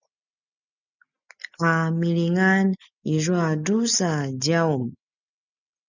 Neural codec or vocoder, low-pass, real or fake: none; 7.2 kHz; real